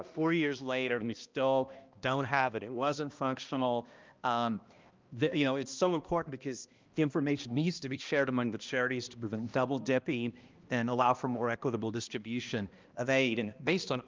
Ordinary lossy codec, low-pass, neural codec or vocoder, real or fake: Opus, 24 kbps; 7.2 kHz; codec, 16 kHz, 1 kbps, X-Codec, HuBERT features, trained on balanced general audio; fake